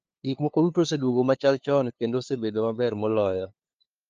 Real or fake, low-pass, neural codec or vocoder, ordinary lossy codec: fake; 7.2 kHz; codec, 16 kHz, 2 kbps, FunCodec, trained on LibriTTS, 25 frames a second; Opus, 24 kbps